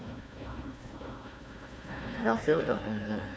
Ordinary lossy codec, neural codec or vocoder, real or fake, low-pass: none; codec, 16 kHz, 1 kbps, FunCodec, trained on Chinese and English, 50 frames a second; fake; none